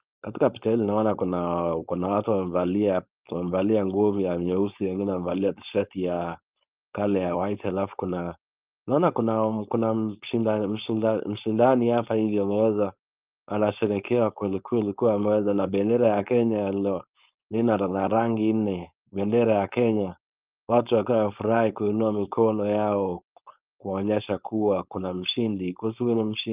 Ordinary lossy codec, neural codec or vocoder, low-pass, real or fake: Opus, 24 kbps; codec, 16 kHz, 4.8 kbps, FACodec; 3.6 kHz; fake